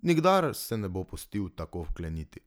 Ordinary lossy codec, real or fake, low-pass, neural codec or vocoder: none; real; none; none